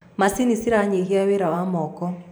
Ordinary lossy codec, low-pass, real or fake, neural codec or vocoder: none; none; fake; vocoder, 44.1 kHz, 128 mel bands every 512 samples, BigVGAN v2